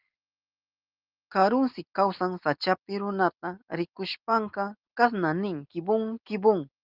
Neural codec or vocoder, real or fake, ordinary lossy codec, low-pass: none; real; Opus, 32 kbps; 5.4 kHz